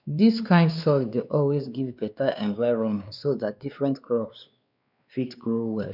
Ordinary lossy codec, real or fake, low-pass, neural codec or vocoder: none; fake; 5.4 kHz; codec, 16 kHz, 2 kbps, X-Codec, WavLM features, trained on Multilingual LibriSpeech